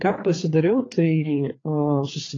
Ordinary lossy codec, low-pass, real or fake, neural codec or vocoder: AAC, 32 kbps; 7.2 kHz; fake; codec, 16 kHz, 4 kbps, FreqCodec, larger model